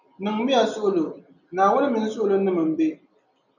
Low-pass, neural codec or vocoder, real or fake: 7.2 kHz; none; real